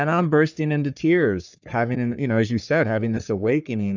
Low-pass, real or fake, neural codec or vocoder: 7.2 kHz; fake; codec, 44.1 kHz, 3.4 kbps, Pupu-Codec